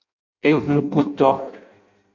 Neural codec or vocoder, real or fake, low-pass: codec, 16 kHz in and 24 kHz out, 0.6 kbps, FireRedTTS-2 codec; fake; 7.2 kHz